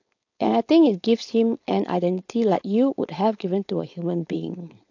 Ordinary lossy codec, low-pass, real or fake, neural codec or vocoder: AAC, 48 kbps; 7.2 kHz; fake; codec, 16 kHz, 4.8 kbps, FACodec